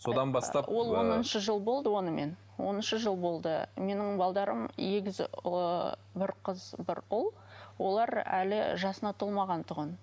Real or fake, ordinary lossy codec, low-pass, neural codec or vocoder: real; none; none; none